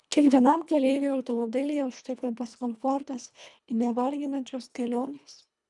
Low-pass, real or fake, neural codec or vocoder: 10.8 kHz; fake; codec, 24 kHz, 1.5 kbps, HILCodec